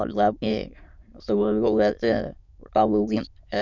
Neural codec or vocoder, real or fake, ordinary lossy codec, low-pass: autoencoder, 22.05 kHz, a latent of 192 numbers a frame, VITS, trained on many speakers; fake; none; 7.2 kHz